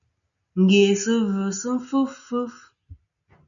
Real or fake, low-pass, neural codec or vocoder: real; 7.2 kHz; none